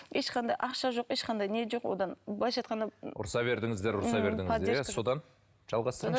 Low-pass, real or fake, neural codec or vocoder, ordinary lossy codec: none; real; none; none